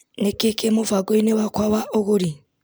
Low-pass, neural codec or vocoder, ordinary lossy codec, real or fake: none; none; none; real